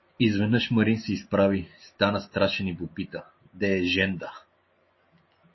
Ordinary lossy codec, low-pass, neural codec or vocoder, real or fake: MP3, 24 kbps; 7.2 kHz; none; real